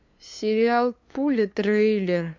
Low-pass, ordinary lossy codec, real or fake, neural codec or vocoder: 7.2 kHz; MP3, 48 kbps; fake; codec, 16 kHz, 2 kbps, FunCodec, trained on LibriTTS, 25 frames a second